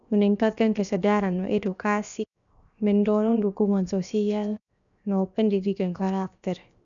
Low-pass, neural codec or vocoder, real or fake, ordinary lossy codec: 7.2 kHz; codec, 16 kHz, 0.7 kbps, FocalCodec; fake; none